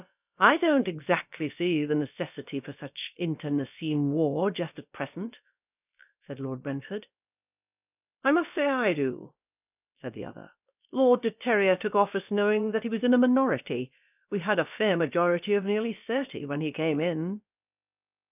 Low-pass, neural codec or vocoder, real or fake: 3.6 kHz; codec, 16 kHz, about 1 kbps, DyCAST, with the encoder's durations; fake